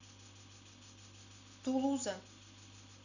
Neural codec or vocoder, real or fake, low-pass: none; real; 7.2 kHz